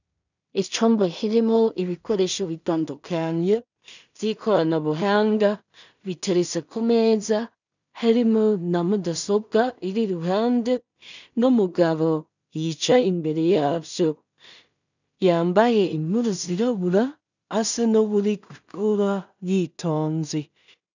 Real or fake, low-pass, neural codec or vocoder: fake; 7.2 kHz; codec, 16 kHz in and 24 kHz out, 0.4 kbps, LongCat-Audio-Codec, two codebook decoder